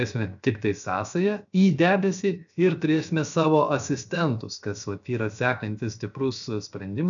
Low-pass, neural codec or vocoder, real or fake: 7.2 kHz; codec, 16 kHz, 0.7 kbps, FocalCodec; fake